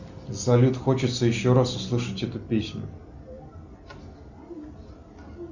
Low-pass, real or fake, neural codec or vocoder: 7.2 kHz; real; none